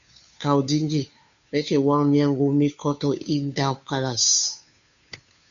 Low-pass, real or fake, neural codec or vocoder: 7.2 kHz; fake; codec, 16 kHz, 2 kbps, FunCodec, trained on Chinese and English, 25 frames a second